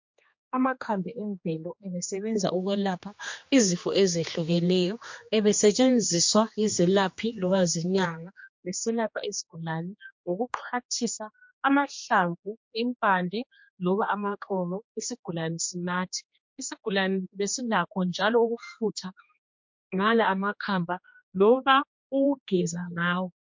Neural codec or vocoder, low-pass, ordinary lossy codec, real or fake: codec, 16 kHz, 2 kbps, X-Codec, HuBERT features, trained on general audio; 7.2 kHz; MP3, 48 kbps; fake